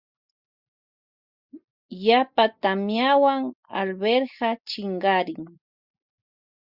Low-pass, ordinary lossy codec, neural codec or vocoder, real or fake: 5.4 kHz; Opus, 64 kbps; none; real